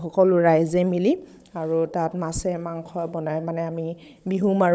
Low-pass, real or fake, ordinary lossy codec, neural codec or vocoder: none; fake; none; codec, 16 kHz, 16 kbps, FunCodec, trained on Chinese and English, 50 frames a second